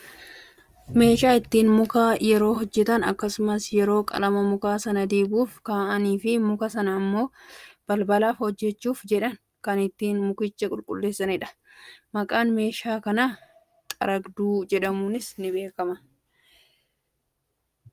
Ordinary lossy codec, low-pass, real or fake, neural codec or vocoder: Opus, 32 kbps; 14.4 kHz; real; none